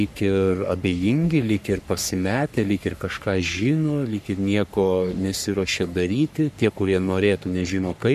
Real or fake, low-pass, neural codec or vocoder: fake; 14.4 kHz; codec, 44.1 kHz, 3.4 kbps, Pupu-Codec